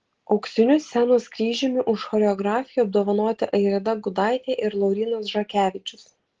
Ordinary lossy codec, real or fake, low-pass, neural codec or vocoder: Opus, 16 kbps; real; 7.2 kHz; none